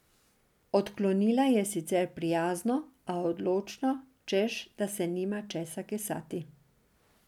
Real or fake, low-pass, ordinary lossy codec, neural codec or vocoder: real; 19.8 kHz; none; none